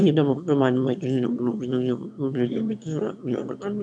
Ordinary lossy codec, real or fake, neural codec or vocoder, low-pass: none; fake; autoencoder, 22.05 kHz, a latent of 192 numbers a frame, VITS, trained on one speaker; 9.9 kHz